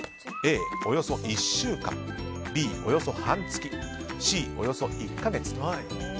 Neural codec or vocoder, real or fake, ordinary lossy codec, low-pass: none; real; none; none